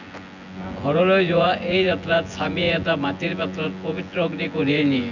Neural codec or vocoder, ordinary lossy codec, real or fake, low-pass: vocoder, 24 kHz, 100 mel bands, Vocos; none; fake; 7.2 kHz